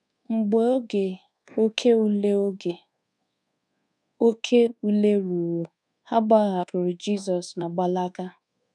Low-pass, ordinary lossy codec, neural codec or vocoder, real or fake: none; none; codec, 24 kHz, 1.2 kbps, DualCodec; fake